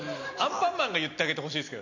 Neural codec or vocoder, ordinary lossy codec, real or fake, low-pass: none; none; real; 7.2 kHz